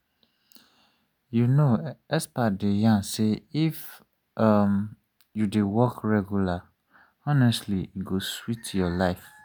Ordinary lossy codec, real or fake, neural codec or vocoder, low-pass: none; real; none; none